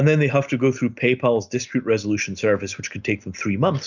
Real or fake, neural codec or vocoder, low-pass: real; none; 7.2 kHz